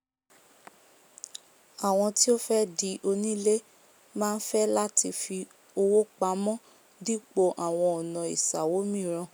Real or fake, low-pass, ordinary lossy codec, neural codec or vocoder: real; none; none; none